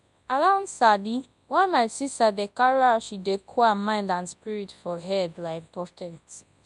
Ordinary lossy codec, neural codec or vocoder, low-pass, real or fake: MP3, 96 kbps; codec, 24 kHz, 0.9 kbps, WavTokenizer, large speech release; 10.8 kHz; fake